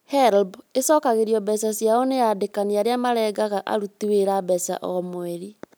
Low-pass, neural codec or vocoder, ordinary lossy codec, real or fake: none; none; none; real